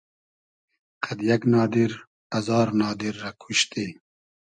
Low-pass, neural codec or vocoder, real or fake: 9.9 kHz; none; real